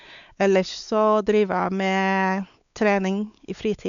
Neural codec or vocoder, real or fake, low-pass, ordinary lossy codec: codec, 16 kHz, 4 kbps, X-Codec, WavLM features, trained on Multilingual LibriSpeech; fake; 7.2 kHz; none